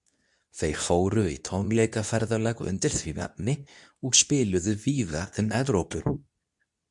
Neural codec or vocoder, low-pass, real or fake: codec, 24 kHz, 0.9 kbps, WavTokenizer, medium speech release version 2; 10.8 kHz; fake